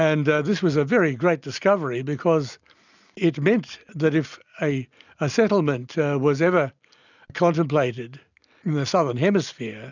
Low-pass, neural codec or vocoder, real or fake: 7.2 kHz; none; real